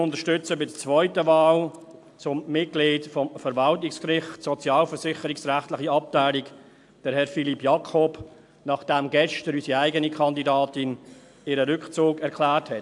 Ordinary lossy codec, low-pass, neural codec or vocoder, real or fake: AAC, 64 kbps; 10.8 kHz; none; real